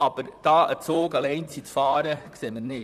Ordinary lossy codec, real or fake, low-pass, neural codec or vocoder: none; fake; 14.4 kHz; vocoder, 44.1 kHz, 128 mel bands, Pupu-Vocoder